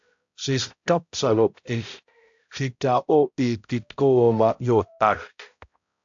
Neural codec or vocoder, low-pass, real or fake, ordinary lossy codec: codec, 16 kHz, 0.5 kbps, X-Codec, HuBERT features, trained on balanced general audio; 7.2 kHz; fake; AAC, 64 kbps